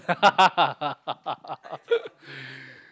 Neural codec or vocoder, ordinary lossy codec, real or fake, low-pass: none; none; real; none